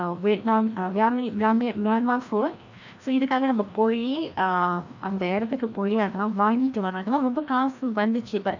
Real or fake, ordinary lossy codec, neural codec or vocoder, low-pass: fake; none; codec, 16 kHz, 1 kbps, FreqCodec, larger model; 7.2 kHz